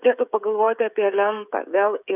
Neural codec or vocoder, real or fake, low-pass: codec, 16 kHz, 16 kbps, FreqCodec, smaller model; fake; 3.6 kHz